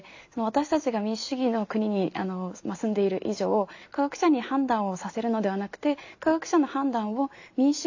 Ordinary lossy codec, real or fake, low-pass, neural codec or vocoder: AAC, 48 kbps; real; 7.2 kHz; none